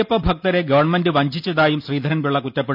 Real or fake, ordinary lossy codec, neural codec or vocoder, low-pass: real; none; none; 5.4 kHz